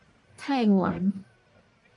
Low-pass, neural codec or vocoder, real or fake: 10.8 kHz; codec, 44.1 kHz, 1.7 kbps, Pupu-Codec; fake